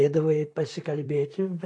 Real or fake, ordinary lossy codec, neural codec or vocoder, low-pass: fake; AAC, 48 kbps; vocoder, 44.1 kHz, 128 mel bands, Pupu-Vocoder; 10.8 kHz